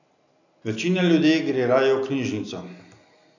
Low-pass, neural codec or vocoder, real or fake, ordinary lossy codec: 7.2 kHz; none; real; none